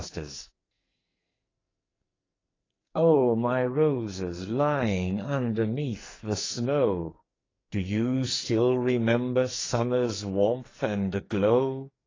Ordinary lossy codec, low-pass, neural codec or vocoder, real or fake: AAC, 32 kbps; 7.2 kHz; codec, 44.1 kHz, 2.6 kbps, SNAC; fake